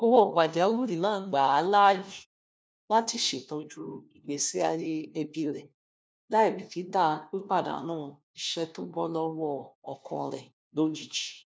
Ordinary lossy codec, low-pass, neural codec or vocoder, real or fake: none; none; codec, 16 kHz, 1 kbps, FunCodec, trained on LibriTTS, 50 frames a second; fake